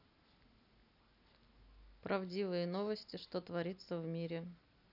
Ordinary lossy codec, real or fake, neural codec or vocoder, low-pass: none; real; none; 5.4 kHz